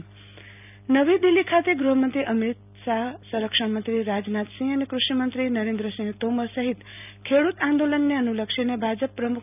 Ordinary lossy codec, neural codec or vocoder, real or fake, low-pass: none; none; real; 3.6 kHz